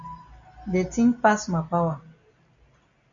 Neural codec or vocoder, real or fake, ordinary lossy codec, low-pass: none; real; AAC, 64 kbps; 7.2 kHz